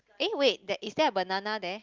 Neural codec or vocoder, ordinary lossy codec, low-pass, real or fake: none; Opus, 32 kbps; 7.2 kHz; real